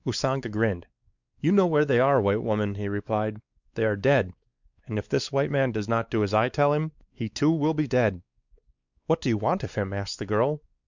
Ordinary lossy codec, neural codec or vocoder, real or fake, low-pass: Opus, 64 kbps; codec, 16 kHz, 4 kbps, X-Codec, WavLM features, trained on Multilingual LibriSpeech; fake; 7.2 kHz